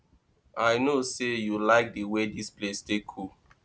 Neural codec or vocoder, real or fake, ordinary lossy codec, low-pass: none; real; none; none